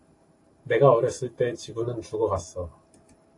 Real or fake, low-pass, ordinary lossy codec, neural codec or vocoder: fake; 10.8 kHz; AAC, 48 kbps; vocoder, 44.1 kHz, 128 mel bands every 512 samples, BigVGAN v2